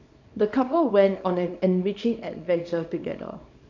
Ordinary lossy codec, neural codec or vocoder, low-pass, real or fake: none; codec, 24 kHz, 0.9 kbps, WavTokenizer, small release; 7.2 kHz; fake